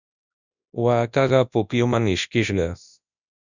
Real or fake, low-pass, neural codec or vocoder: fake; 7.2 kHz; codec, 24 kHz, 0.9 kbps, WavTokenizer, large speech release